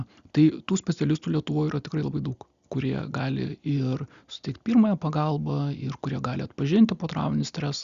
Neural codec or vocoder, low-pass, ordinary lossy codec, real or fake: none; 7.2 kHz; Opus, 64 kbps; real